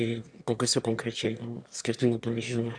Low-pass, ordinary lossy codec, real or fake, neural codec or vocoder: 9.9 kHz; MP3, 96 kbps; fake; autoencoder, 22.05 kHz, a latent of 192 numbers a frame, VITS, trained on one speaker